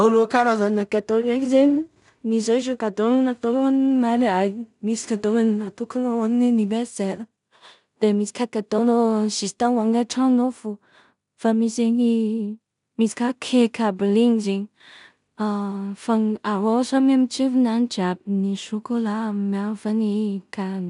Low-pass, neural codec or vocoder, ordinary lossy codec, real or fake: 10.8 kHz; codec, 16 kHz in and 24 kHz out, 0.4 kbps, LongCat-Audio-Codec, two codebook decoder; none; fake